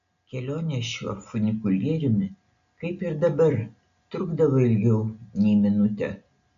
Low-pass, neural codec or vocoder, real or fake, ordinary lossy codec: 7.2 kHz; none; real; AAC, 96 kbps